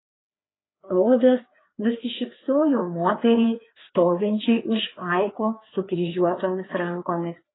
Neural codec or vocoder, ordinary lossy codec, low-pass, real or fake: codec, 16 kHz, 2 kbps, FreqCodec, larger model; AAC, 16 kbps; 7.2 kHz; fake